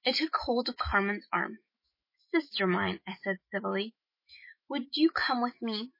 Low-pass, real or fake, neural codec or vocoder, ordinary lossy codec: 5.4 kHz; fake; autoencoder, 48 kHz, 128 numbers a frame, DAC-VAE, trained on Japanese speech; MP3, 24 kbps